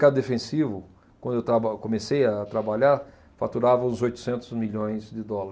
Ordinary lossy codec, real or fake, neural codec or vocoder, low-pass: none; real; none; none